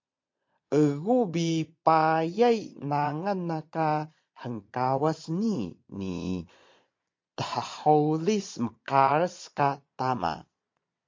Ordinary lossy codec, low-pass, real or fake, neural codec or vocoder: MP3, 48 kbps; 7.2 kHz; fake; vocoder, 22.05 kHz, 80 mel bands, Vocos